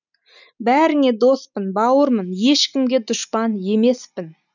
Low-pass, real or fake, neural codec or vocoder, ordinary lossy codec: 7.2 kHz; real; none; none